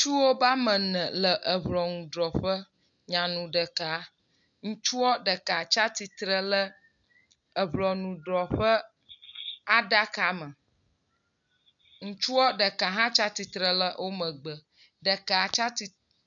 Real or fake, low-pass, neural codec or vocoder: real; 7.2 kHz; none